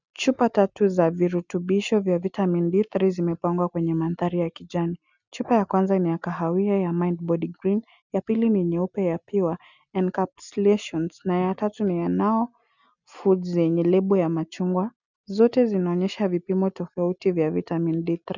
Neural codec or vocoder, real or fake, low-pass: none; real; 7.2 kHz